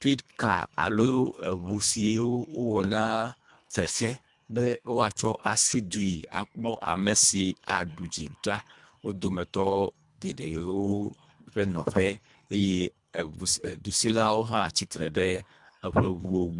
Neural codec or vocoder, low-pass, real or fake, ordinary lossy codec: codec, 24 kHz, 1.5 kbps, HILCodec; 10.8 kHz; fake; MP3, 96 kbps